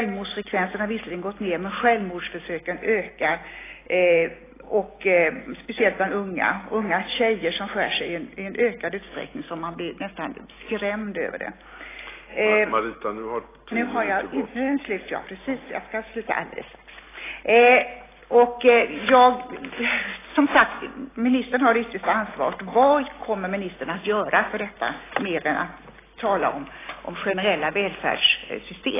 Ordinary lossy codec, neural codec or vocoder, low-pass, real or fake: AAC, 16 kbps; none; 3.6 kHz; real